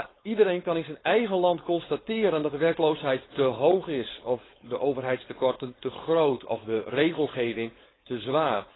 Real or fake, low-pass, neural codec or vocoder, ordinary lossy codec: fake; 7.2 kHz; codec, 16 kHz, 4.8 kbps, FACodec; AAC, 16 kbps